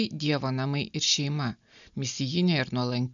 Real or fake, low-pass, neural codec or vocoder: real; 7.2 kHz; none